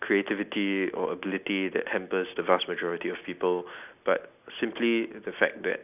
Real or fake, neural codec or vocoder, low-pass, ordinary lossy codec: real; none; 3.6 kHz; none